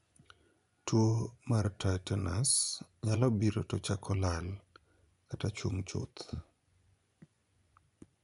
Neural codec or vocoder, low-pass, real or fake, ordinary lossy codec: vocoder, 24 kHz, 100 mel bands, Vocos; 10.8 kHz; fake; none